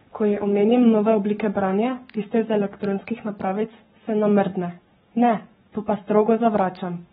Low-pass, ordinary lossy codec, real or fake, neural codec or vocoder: 19.8 kHz; AAC, 16 kbps; fake; codec, 44.1 kHz, 7.8 kbps, Pupu-Codec